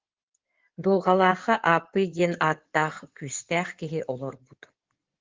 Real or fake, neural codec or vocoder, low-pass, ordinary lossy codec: fake; codec, 16 kHz in and 24 kHz out, 2.2 kbps, FireRedTTS-2 codec; 7.2 kHz; Opus, 16 kbps